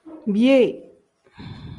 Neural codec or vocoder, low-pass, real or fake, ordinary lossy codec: none; 10.8 kHz; real; Opus, 32 kbps